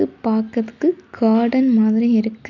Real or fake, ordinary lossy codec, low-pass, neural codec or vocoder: real; none; 7.2 kHz; none